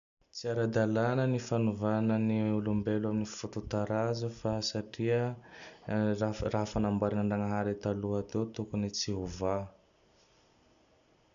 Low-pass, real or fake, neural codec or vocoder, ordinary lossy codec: 7.2 kHz; real; none; none